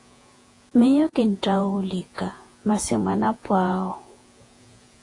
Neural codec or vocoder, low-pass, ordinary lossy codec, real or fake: vocoder, 48 kHz, 128 mel bands, Vocos; 10.8 kHz; AAC, 48 kbps; fake